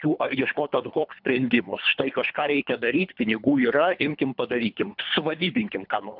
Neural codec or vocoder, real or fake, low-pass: codec, 24 kHz, 3 kbps, HILCodec; fake; 5.4 kHz